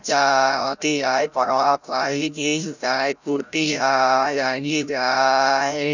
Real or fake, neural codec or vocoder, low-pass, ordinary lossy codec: fake; codec, 16 kHz, 0.5 kbps, FreqCodec, larger model; 7.2 kHz; none